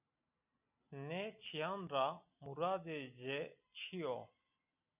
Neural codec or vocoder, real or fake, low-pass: none; real; 3.6 kHz